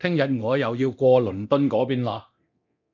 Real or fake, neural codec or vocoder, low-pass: fake; codec, 24 kHz, 0.9 kbps, DualCodec; 7.2 kHz